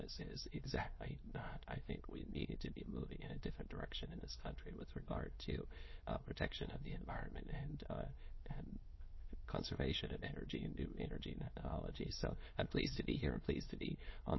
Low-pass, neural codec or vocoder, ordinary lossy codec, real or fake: 7.2 kHz; autoencoder, 22.05 kHz, a latent of 192 numbers a frame, VITS, trained on many speakers; MP3, 24 kbps; fake